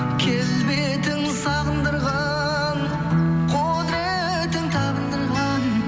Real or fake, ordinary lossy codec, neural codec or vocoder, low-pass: real; none; none; none